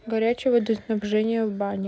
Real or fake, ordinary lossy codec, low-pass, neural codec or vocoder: real; none; none; none